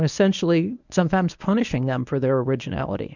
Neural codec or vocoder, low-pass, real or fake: codec, 24 kHz, 0.9 kbps, WavTokenizer, medium speech release version 1; 7.2 kHz; fake